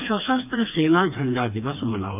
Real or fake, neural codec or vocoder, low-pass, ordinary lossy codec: fake; codec, 16 kHz, 2 kbps, FreqCodec, smaller model; 3.6 kHz; none